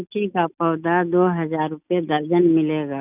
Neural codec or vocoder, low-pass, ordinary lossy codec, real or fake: none; 3.6 kHz; none; real